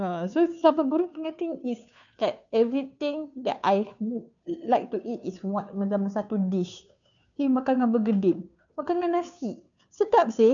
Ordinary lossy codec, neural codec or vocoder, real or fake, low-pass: none; codec, 16 kHz, 2 kbps, FunCodec, trained on Chinese and English, 25 frames a second; fake; 7.2 kHz